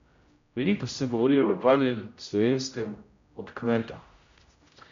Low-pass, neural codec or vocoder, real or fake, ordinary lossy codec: 7.2 kHz; codec, 16 kHz, 0.5 kbps, X-Codec, HuBERT features, trained on general audio; fake; MP3, 64 kbps